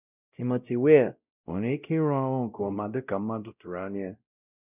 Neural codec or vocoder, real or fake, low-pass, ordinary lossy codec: codec, 16 kHz, 0.5 kbps, X-Codec, WavLM features, trained on Multilingual LibriSpeech; fake; 3.6 kHz; none